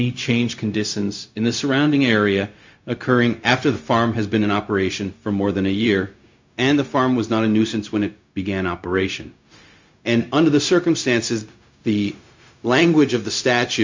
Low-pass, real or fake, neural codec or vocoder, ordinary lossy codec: 7.2 kHz; fake; codec, 16 kHz, 0.4 kbps, LongCat-Audio-Codec; MP3, 48 kbps